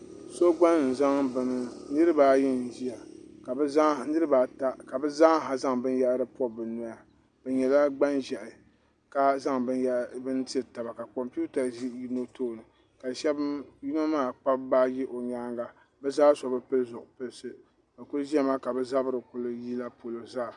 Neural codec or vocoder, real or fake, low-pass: none; real; 10.8 kHz